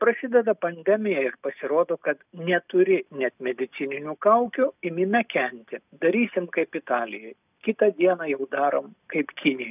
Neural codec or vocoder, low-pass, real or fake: none; 3.6 kHz; real